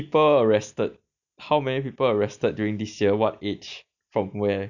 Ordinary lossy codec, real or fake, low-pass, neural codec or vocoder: none; real; 7.2 kHz; none